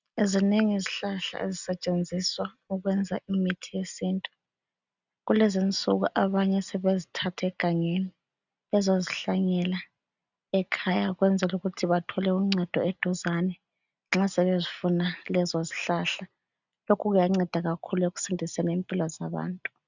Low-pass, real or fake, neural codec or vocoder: 7.2 kHz; real; none